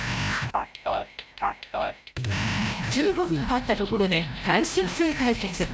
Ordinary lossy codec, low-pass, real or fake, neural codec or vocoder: none; none; fake; codec, 16 kHz, 0.5 kbps, FreqCodec, larger model